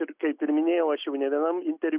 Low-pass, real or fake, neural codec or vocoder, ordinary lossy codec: 3.6 kHz; real; none; Opus, 64 kbps